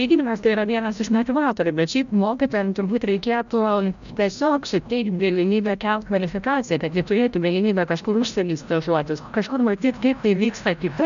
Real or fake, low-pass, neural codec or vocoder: fake; 7.2 kHz; codec, 16 kHz, 0.5 kbps, FreqCodec, larger model